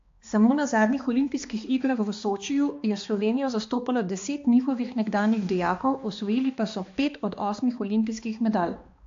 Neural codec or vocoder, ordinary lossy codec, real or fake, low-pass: codec, 16 kHz, 2 kbps, X-Codec, HuBERT features, trained on balanced general audio; AAC, 48 kbps; fake; 7.2 kHz